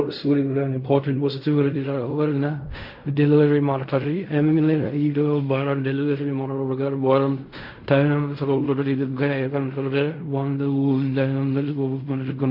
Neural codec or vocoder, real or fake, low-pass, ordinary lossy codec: codec, 16 kHz in and 24 kHz out, 0.4 kbps, LongCat-Audio-Codec, fine tuned four codebook decoder; fake; 5.4 kHz; MP3, 32 kbps